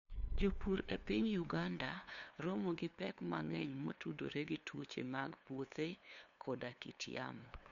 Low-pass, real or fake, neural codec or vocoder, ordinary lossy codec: 7.2 kHz; fake; codec, 16 kHz, 2 kbps, FunCodec, trained on LibriTTS, 25 frames a second; none